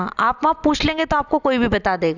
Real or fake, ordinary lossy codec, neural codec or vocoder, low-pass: real; none; none; 7.2 kHz